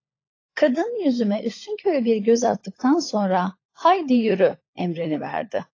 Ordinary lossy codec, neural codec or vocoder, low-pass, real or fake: AAC, 32 kbps; codec, 16 kHz, 16 kbps, FunCodec, trained on LibriTTS, 50 frames a second; 7.2 kHz; fake